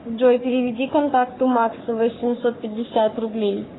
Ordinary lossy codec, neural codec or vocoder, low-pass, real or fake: AAC, 16 kbps; codec, 44.1 kHz, 3.4 kbps, Pupu-Codec; 7.2 kHz; fake